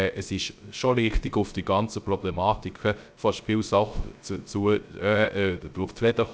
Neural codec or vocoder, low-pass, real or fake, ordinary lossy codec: codec, 16 kHz, 0.3 kbps, FocalCodec; none; fake; none